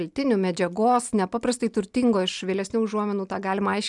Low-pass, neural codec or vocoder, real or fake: 10.8 kHz; none; real